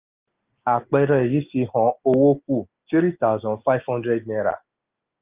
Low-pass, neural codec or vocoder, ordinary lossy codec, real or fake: 3.6 kHz; none; Opus, 24 kbps; real